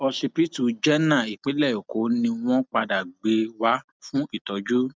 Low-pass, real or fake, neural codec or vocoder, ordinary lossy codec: none; real; none; none